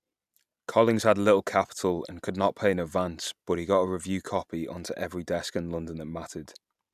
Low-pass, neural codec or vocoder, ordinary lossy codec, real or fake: 14.4 kHz; none; none; real